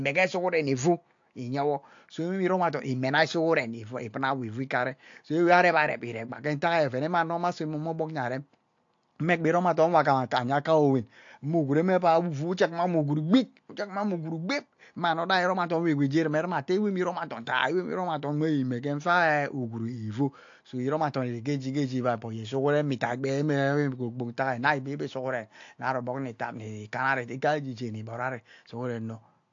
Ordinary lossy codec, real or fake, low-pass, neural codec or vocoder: AAC, 48 kbps; real; 7.2 kHz; none